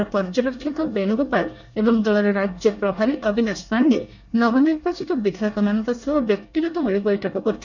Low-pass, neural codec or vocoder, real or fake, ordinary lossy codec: 7.2 kHz; codec, 24 kHz, 1 kbps, SNAC; fake; none